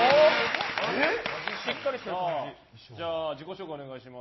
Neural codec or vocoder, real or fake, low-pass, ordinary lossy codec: none; real; 7.2 kHz; MP3, 24 kbps